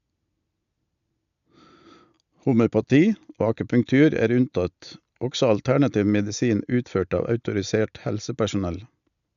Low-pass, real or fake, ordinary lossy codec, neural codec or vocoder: 7.2 kHz; real; none; none